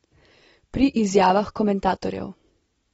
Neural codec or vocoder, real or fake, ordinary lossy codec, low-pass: none; real; AAC, 24 kbps; 10.8 kHz